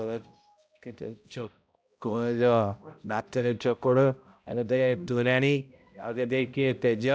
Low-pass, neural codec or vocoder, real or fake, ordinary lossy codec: none; codec, 16 kHz, 0.5 kbps, X-Codec, HuBERT features, trained on balanced general audio; fake; none